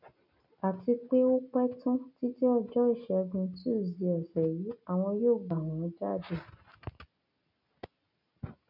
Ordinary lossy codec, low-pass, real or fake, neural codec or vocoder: none; 5.4 kHz; real; none